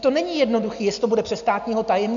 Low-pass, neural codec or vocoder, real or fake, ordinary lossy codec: 7.2 kHz; none; real; MP3, 96 kbps